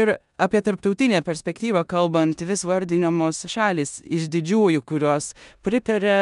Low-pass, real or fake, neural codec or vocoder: 10.8 kHz; fake; codec, 16 kHz in and 24 kHz out, 0.9 kbps, LongCat-Audio-Codec, four codebook decoder